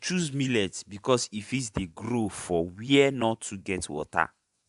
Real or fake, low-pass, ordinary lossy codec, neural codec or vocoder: fake; 10.8 kHz; AAC, 96 kbps; vocoder, 24 kHz, 100 mel bands, Vocos